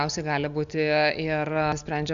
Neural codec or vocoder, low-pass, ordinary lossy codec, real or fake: none; 7.2 kHz; Opus, 64 kbps; real